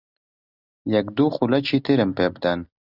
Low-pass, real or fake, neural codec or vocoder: 5.4 kHz; real; none